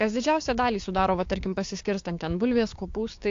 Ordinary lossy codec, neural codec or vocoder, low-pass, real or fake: AAC, 48 kbps; codec, 16 kHz, 6 kbps, DAC; 7.2 kHz; fake